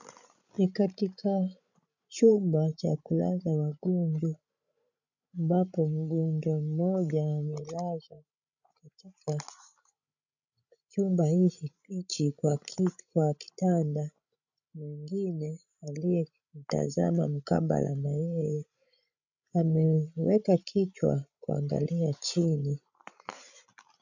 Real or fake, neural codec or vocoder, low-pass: fake; codec, 16 kHz, 16 kbps, FreqCodec, larger model; 7.2 kHz